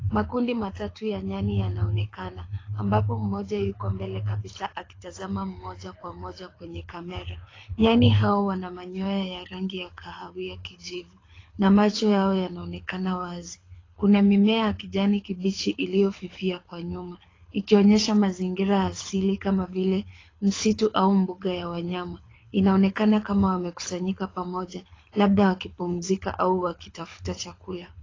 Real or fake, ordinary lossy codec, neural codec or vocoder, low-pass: fake; AAC, 32 kbps; codec, 24 kHz, 6 kbps, HILCodec; 7.2 kHz